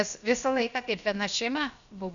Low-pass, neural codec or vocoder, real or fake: 7.2 kHz; codec, 16 kHz, about 1 kbps, DyCAST, with the encoder's durations; fake